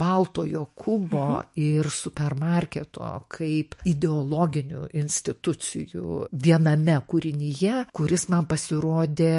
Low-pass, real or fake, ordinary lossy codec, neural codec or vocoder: 14.4 kHz; fake; MP3, 48 kbps; autoencoder, 48 kHz, 128 numbers a frame, DAC-VAE, trained on Japanese speech